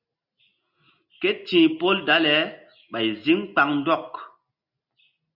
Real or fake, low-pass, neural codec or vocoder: real; 5.4 kHz; none